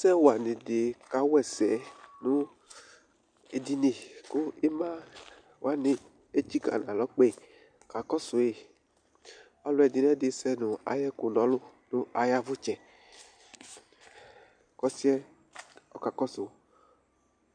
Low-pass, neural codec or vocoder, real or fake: 9.9 kHz; none; real